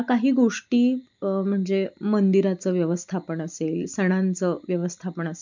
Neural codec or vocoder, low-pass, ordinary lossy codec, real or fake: none; 7.2 kHz; MP3, 64 kbps; real